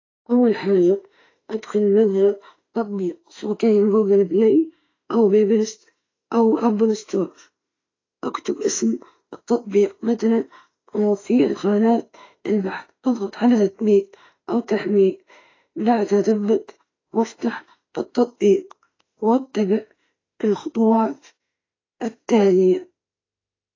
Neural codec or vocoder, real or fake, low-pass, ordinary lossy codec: autoencoder, 48 kHz, 32 numbers a frame, DAC-VAE, trained on Japanese speech; fake; 7.2 kHz; AAC, 32 kbps